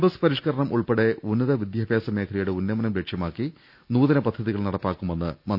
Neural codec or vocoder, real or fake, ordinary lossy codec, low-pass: none; real; none; 5.4 kHz